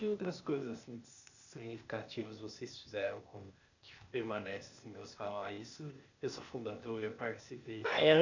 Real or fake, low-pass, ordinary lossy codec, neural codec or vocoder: fake; 7.2 kHz; none; codec, 16 kHz, 0.8 kbps, ZipCodec